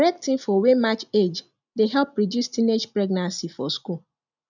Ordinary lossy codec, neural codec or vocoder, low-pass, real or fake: none; none; 7.2 kHz; real